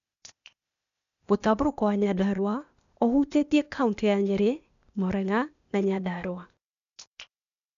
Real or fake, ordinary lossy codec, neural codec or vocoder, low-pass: fake; none; codec, 16 kHz, 0.8 kbps, ZipCodec; 7.2 kHz